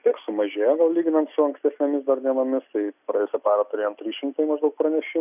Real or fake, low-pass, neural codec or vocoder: real; 3.6 kHz; none